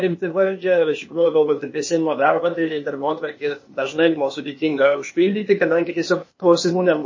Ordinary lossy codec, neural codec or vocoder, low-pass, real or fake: MP3, 32 kbps; codec, 16 kHz, 0.8 kbps, ZipCodec; 7.2 kHz; fake